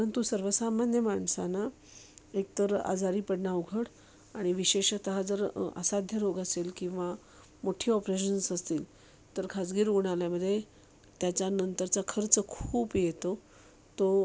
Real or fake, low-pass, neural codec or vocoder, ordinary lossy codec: real; none; none; none